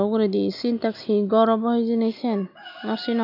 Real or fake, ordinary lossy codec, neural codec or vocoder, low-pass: real; none; none; 5.4 kHz